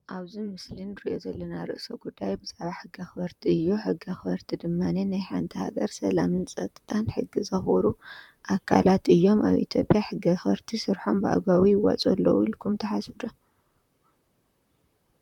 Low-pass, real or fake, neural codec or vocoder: 19.8 kHz; fake; vocoder, 44.1 kHz, 128 mel bands, Pupu-Vocoder